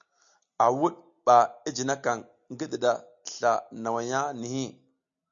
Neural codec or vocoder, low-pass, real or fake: none; 7.2 kHz; real